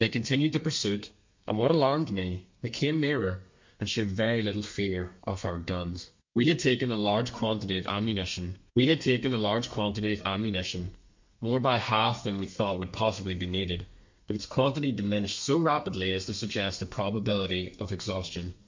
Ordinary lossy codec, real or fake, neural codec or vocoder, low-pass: MP3, 48 kbps; fake; codec, 32 kHz, 1.9 kbps, SNAC; 7.2 kHz